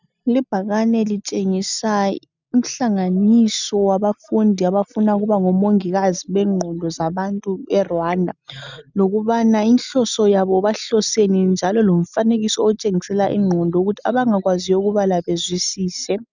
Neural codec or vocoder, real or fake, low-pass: none; real; 7.2 kHz